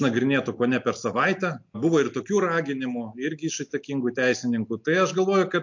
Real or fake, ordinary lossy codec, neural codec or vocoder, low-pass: real; MP3, 48 kbps; none; 7.2 kHz